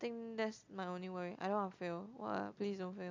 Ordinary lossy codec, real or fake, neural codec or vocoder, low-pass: MP3, 64 kbps; real; none; 7.2 kHz